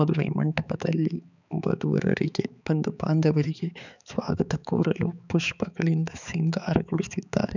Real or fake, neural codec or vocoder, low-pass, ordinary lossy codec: fake; codec, 16 kHz, 4 kbps, X-Codec, HuBERT features, trained on balanced general audio; 7.2 kHz; none